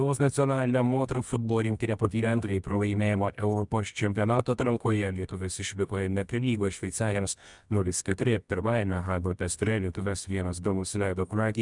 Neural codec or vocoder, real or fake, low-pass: codec, 24 kHz, 0.9 kbps, WavTokenizer, medium music audio release; fake; 10.8 kHz